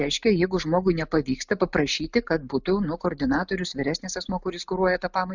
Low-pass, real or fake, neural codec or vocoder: 7.2 kHz; real; none